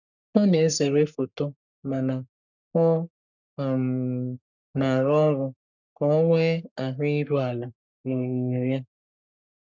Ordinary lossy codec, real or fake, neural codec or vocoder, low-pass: none; fake; codec, 44.1 kHz, 3.4 kbps, Pupu-Codec; 7.2 kHz